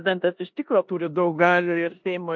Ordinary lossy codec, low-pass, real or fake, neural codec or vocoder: MP3, 48 kbps; 7.2 kHz; fake; codec, 16 kHz in and 24 kHz out, 0.9 kbps, LongCat-Audio-Codec, four codebook decoder